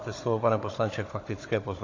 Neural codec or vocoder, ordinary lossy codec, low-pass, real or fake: codec, 16 kHz, 16 kbps, FunCodec, trained on Chinese and English, 50 frames a second; AAC, 32 kbps; 7.2 kHz; fake